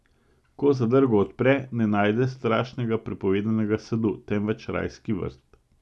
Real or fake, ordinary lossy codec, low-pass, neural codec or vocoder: real; none; none; none